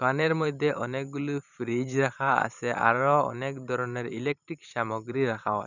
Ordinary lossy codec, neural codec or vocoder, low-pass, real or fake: none; vocoder, 44.1 kHz, 128 mel bands every 512 samples, BigVGAN v2; 7.2 kHz; fake